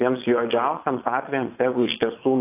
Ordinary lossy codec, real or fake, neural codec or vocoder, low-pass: AAC, 24 kbps; fake; vocoder, 22.05 kHz, 80 mel bands, Vocos; 3.6 kHz